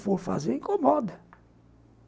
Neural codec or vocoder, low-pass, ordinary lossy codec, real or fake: none; none; none; real